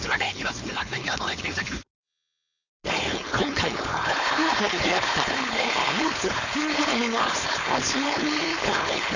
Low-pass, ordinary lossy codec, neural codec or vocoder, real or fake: 7.2 kHz; none; codec, 16 kHz, 4.8 kbps, FACodec; fake